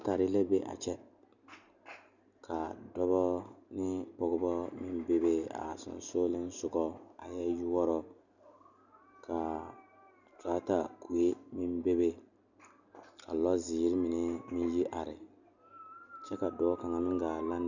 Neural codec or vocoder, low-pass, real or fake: none; 7.2 kHz; real